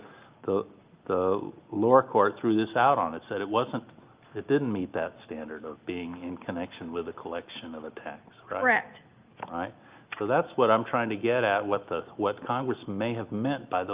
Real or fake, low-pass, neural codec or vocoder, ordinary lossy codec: real; 3.6 kHz; none; Opus, 32 kbps